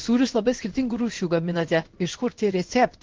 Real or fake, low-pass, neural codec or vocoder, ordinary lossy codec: fake; 7.2 kHz; codec, 16 kHz in and 24 kHz out, 1 kbps, XY-Tokenizer; Opus, 16 kbps